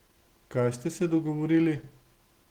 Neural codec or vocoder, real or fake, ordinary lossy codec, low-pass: none; real; Opus, 16 kbps; 19.8 kHz